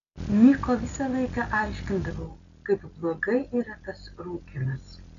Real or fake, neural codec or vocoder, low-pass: real; none; 7.2 kHz